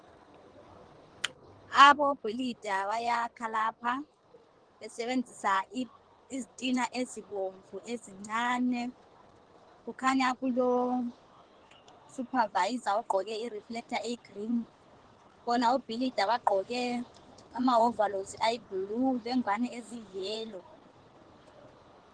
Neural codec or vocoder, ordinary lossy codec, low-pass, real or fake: codec, 16 kHz in and 24 kHz out, 2.2 kbps, FireRedTTS-2 codec; Opus, 16 kbps; 9.9 kHz; fake